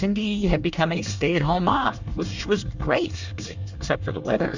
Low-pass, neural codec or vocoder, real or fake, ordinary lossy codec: 7.2 kHz; codec, 24 kHz, 1 kbps, SNAC; fake; Opus, 64 kbps